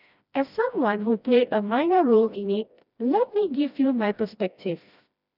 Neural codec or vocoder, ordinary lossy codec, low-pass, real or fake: codec, 16 kHz, 1 kbps, FreqCodec, smaller model; none; 5.4 kHz; fake